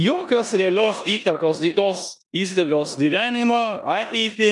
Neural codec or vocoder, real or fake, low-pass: codec, 16 kHz in and 24 kHz out, 0.9 kbps, LongCat-Audio-Codec, four codebook decoder; fake; 10.8 kHz